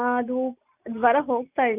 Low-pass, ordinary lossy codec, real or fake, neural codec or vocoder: 3.6 kHz; none; real; none